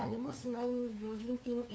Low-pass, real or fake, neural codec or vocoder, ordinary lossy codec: none; fake; codec, 16 kHz, 2 kbps, FunCodec, trained on LibriTTS, 25 frames a second; none